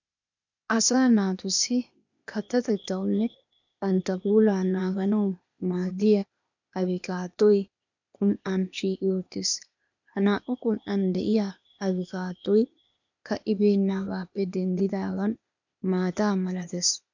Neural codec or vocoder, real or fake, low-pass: codec, 16 kHz, 0.8 kbps, ZipCodec; fake; 7.2 kHz